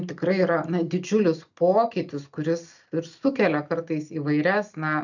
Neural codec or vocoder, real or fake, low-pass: none; real; 7.2 kHz